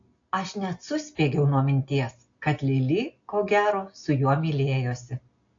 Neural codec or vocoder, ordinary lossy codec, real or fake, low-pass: none; AAC, 48 kbps; real; 7.2 kHz